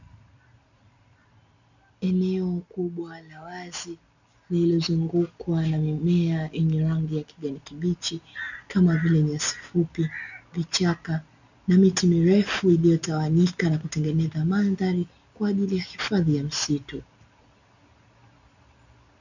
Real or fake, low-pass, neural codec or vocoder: real; 7.2 kHz; none